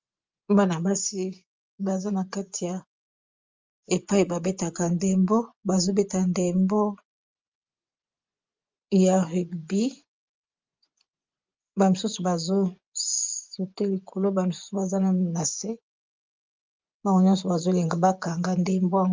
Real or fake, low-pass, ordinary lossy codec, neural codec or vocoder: fake; 7.2 kHz; Opus, 32 kbps; vocoder, 24 kHz, 100 mel bands, Vocos